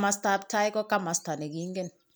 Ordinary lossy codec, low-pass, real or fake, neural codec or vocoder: none; none; real; none